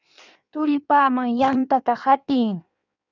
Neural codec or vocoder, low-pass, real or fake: codec, 16 kHz in and 24 kHz out, 1.1 kbps, FireRedTTS-2 codec; 7.2 kHz; fake